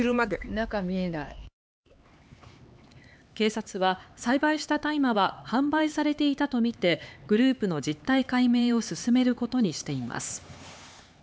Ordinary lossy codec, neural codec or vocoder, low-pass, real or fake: none; codec, 16 kHz, 4 kbps, X-Codec, HuBERT features, trained on LibriSpeech; none; fake